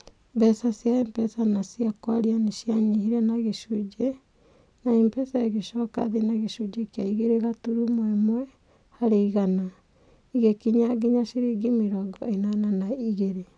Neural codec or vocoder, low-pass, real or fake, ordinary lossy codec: none; 9.9 kHz; real; none